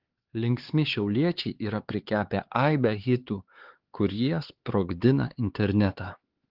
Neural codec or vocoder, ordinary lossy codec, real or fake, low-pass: codec, 16 kHz, 4 kbps, X-Codec, HuBERT features, trained on LibriSpeech; Opus, 16 kbps; fake; 5.4 kHz